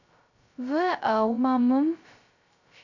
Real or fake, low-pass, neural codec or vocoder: fake; 7.2 kHz; codec, 16 kHz, 0.2 kbps, FocalCodec